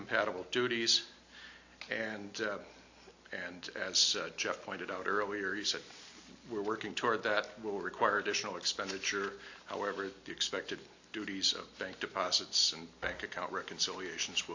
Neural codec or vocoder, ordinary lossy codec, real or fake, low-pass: none; AAC, 48 kbps; real; 7.2 kHz